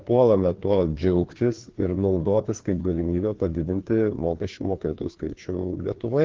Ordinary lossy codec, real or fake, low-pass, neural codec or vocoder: Opus, 16 kbps; fake; 7.2 kHz; codec, 16 kHz, 2 kbps, FreqCodec, larger model